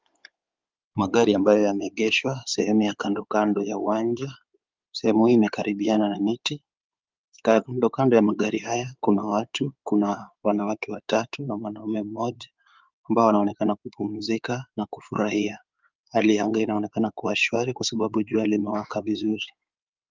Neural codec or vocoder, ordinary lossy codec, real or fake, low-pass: codec, 16 kHz in and 24 kHz out, 2.2 kbps, FireRedTTS-2 codec; Opus, 24 kbps; fake; 7.2 kHz